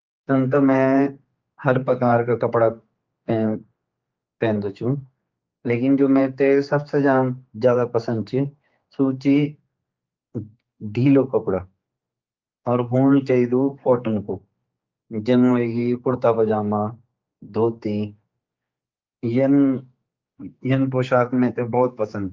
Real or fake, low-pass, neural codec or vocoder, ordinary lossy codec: fake; 7.2 kHz; codec, 16 kHz, 4 kbps, X-Codec, HuBERT features, trained on general audio; Opus, 24 kbps